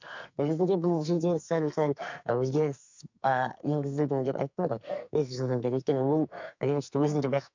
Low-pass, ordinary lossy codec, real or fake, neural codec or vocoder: 7.2 kHz; MP3, 64 kbps; fake; codec, 44.1 kHz, 2.6 kbps, SNAC